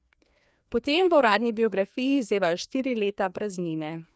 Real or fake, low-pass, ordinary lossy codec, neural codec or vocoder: fake; none; none; codec, 16 kHz, 2 kbps, FreqCodec, larger model